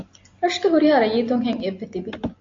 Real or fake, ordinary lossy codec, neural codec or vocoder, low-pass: real; AAC, 48 kbps; none; 7.2 kHz